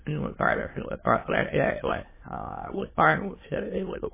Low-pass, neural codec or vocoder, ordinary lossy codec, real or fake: 3.6 kHz; autoencoder, 22.05 kHz, a latent of 192 numbers a frame, VITS, trained on many speakers; MP3, 16 kbps; fake